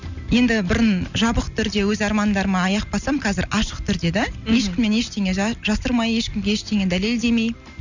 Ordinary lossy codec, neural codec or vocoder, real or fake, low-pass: none; none; real; 7.2 kHz